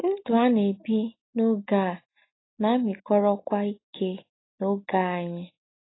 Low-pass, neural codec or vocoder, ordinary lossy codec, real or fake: 7.2 kHz; none; AAC, 16 kbps; real